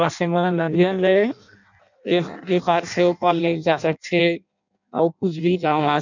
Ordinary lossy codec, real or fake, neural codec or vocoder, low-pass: none; fake; codec, 16 kHz in and 24 kHz out, 0.6 kbps, FireRedTTS-2 codec; 7.2 kHz